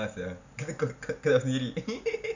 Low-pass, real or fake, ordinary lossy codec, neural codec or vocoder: 7.2 kHz; real; none; none